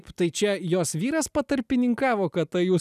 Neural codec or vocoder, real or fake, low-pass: none; real; 14.4 kHz